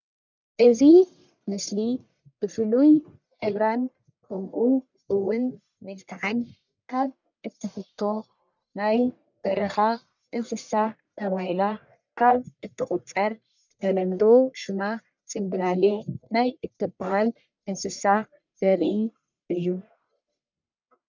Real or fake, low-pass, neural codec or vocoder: fake; 7.2 kHz; codec, 44.1 kHz, 1.7 kbps, Pupu-Codec